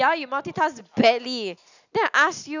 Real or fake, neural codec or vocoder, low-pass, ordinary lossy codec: real; none; 7.2 kHz; none